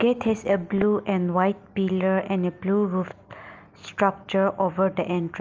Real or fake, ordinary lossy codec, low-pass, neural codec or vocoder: real; Opus, 24 kbps; 7.2 kHz; none